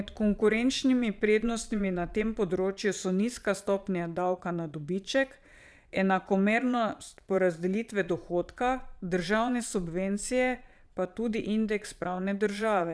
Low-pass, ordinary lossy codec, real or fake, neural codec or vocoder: none; none; fake; vocoder, 22.05 kHz, 80 mel bands, Vocos